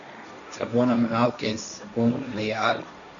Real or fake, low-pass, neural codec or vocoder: fake; 7.2 kHz; codec, 16 kHz, 1.1 kbps, Voila-Tokenizer